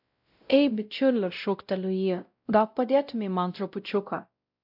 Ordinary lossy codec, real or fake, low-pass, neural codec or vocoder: AAC, 48 kbps; fake; 5.4 kHz; codec, 16 kHz, 0.5 kbps, X-Codec, WavLM features, trained on Multilingual LibriSpeech